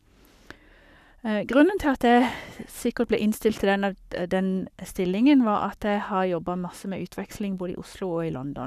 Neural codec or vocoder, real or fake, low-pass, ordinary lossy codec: codec, 44.1 kHz, 7.8 kbps, Pupu-Codec; fake; 14.4 kHz; none